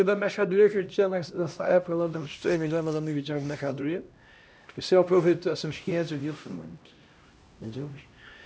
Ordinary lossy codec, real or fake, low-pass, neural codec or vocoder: none; fake; none; codec, 16 kHz, 1 kbps, X-Codec, HuBERT features, trained on LibriSpeech